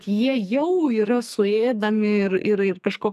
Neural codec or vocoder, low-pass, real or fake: codec, 44.1 kHz, 2.6 kbps, SNAC; 14.4 kHz; fake